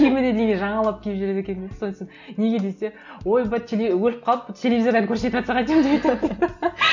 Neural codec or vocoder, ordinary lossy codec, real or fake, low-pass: none; none; real; 7.2 kHz